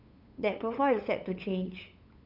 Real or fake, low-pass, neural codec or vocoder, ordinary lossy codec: fake; 5.4 kHz; codec, 16 kHz, 8 kbps, FunCodec, trained on LibriTTS, 25 frames a second; none